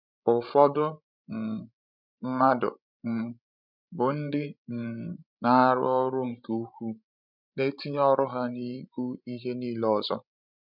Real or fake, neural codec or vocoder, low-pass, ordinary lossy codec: fake; codec, 16 kHz, 16 kbps, FreqCodec, larger model; 5.4 kHz; none